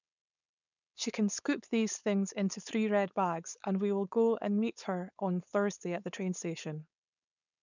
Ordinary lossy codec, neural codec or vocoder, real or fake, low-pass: none; codec, 16 kHz, 4.8 kbps, FACodec; fake; 7.2 kHz